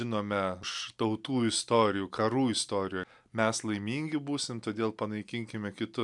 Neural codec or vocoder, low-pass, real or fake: none; 10.8 kHz; real